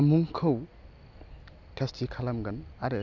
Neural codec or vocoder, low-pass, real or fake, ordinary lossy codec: none; 7.2 kHz; real; none